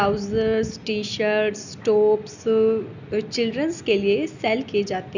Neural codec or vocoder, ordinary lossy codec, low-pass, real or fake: none; none; 7.2 kHz; real